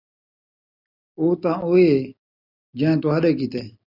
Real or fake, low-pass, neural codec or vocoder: real; 5.4 kHz; none